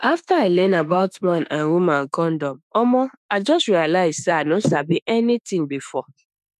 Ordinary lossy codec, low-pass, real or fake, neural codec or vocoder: none; 14.4 kHz; fake; autoencoder, 48 kHz, 32 numbers a frame, DAC-VAE, trained on Japanese speech